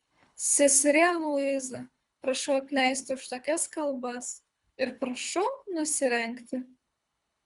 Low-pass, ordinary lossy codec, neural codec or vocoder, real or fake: 10.8 kHz; Opus, 64 kbps; codec, 24 kHz, 3 kbps, HILCodec; fake